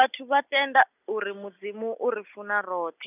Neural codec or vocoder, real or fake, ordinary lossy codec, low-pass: none; real; none; 3.6 kHz